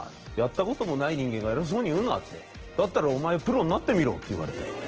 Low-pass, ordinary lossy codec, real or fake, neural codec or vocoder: 7.2 kHz; Opus, 16 kbps; real; none